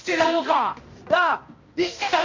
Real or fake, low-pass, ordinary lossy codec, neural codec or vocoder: fake; 7.2 kHz; MP3, 48 kbps; codec, 16 kHz, 1 kbps, X-Codec, HuBERT features, trained on balanced general audio